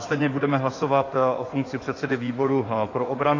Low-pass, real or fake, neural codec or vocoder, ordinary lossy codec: 7.2 kHz; fake; codec, 16 kHz in and 24 kHz out, 2.2 kbps, FireRedTTS-2 codec; AAC, 32 kbps